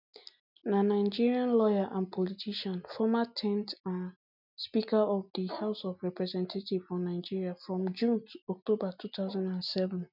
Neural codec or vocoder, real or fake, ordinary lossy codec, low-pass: none; real; none; 5.4 kHz